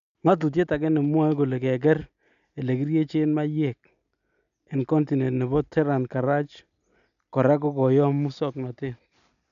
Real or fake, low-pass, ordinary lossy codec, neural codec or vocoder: real; 7.2 kHz; MP3, 96 kbps; none